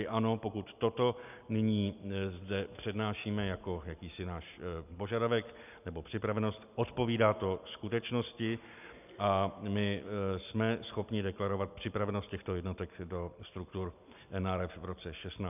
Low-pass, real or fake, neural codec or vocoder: 3.6 kHz; real; none